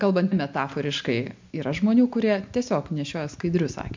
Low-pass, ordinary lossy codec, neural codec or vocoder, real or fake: 7.2 kHz; MP3, 64 kbps; none; real